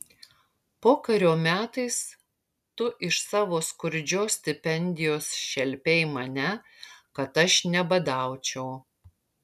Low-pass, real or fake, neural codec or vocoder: 14.4 kHz; real; none